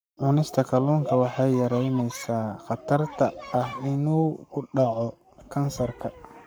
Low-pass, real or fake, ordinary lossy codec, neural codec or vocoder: none; fake; none; codec, 44.1 kHz, 7.8 kbps, Pupu-Codec